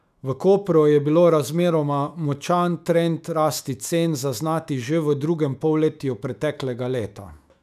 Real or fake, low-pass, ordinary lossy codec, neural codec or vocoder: fake; 14.4 kHz; none; autoencoder, 48 kHz, 128 numbers a frame, DAC-VAE, trained on Japanese speech